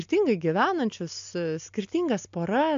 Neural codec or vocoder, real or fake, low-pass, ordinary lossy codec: none; real; 7.2 kHz; MP3, 64 kbps